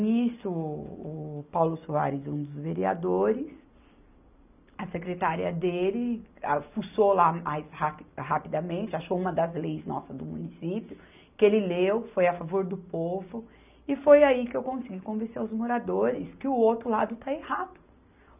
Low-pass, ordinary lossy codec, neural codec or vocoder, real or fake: 3.6 kHz; none; none; real